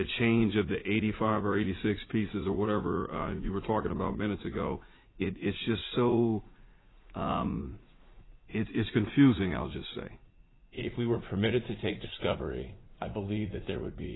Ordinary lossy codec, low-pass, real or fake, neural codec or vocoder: AAC, 16 kbps; 7.2 kHz; fake; vocoder, 44.1 kHz, 80 mel bands, Vocos